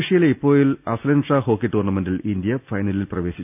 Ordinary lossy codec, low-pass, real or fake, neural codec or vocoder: none; 3.6 kHz; real; none